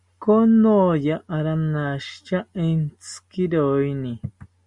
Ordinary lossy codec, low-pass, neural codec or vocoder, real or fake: Opus, 64 kbps; 10.8 kHz; none; real